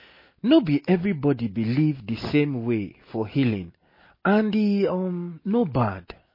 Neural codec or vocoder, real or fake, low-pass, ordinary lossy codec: none; real; 5.4 kHz; MP3, 24 kbps